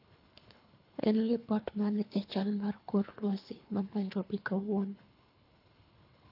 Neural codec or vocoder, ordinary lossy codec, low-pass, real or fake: codec, 24 kHz, 3 kbps, HILCodec; AAC, 32 kbps; 5.4 kHz; fake